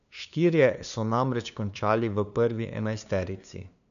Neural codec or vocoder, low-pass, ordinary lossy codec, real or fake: codec, 16 kHz, 2 kbps, FunCodec, trained on LibriTTS, 25 frames a second; 7.2 kHz; none; fake